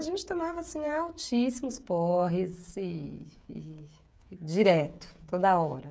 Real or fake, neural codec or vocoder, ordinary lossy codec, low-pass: fake; codec, 16 kHz, 8 kbps, FreqCodec, smaller model; none; none